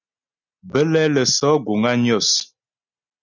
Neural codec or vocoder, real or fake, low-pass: none; real; 7.2 kHz